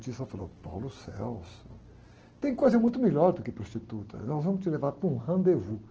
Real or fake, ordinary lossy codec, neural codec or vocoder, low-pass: real; Opus, 16 kbps; none; 7.2 kHz